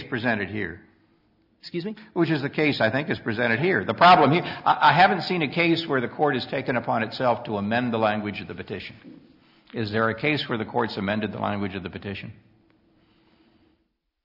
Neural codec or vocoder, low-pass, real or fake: none; 5.4 kHz; real